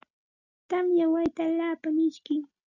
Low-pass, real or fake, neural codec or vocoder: 7.2 kHz; real; none